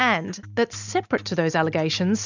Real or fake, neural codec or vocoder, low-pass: real; none; 7.2 kHz